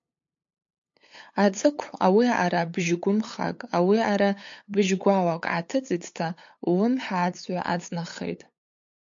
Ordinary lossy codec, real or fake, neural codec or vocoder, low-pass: MP3, 48 kbps; fake; codec, 16 kHz, 8 kbps, FunCodec, trained on LibriTTS, 25 frames a second; 7.2 kHz